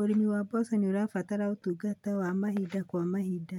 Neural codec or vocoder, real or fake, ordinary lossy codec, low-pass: none; real; none; 19.8 kHz